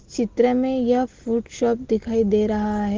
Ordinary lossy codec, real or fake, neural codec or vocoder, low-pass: Opus, 16 kbps; real; none; 7.2 kHz